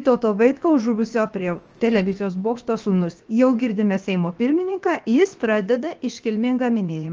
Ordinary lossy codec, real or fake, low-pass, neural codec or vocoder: Opus, 24 kbps; fake; 7.2 kHz; codec, 16 kHz, 0.7 kbps, FocalCodec